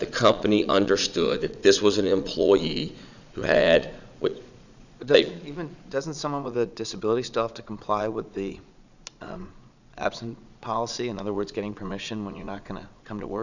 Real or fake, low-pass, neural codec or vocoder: fake; 7.2 kHz; vocoder, 22.05 kHz, 80 mel bands, Vocos